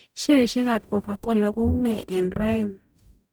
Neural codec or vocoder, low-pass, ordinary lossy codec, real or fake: codec, 44.1 kHz, 0.9 kbps, DAC; none; none; fake